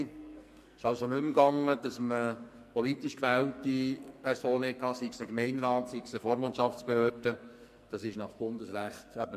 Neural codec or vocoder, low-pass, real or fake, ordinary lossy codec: codec, 44.1 kHz, 2.6 kbps, SNAC; 14.4 kHz; fake; MP3, 64 kbps